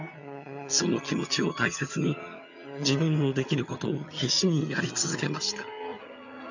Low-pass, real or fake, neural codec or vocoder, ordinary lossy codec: 7.2 kHz; fake; vocoder, 22.05 kHz, 80 mel bands, HiFi-GAN; none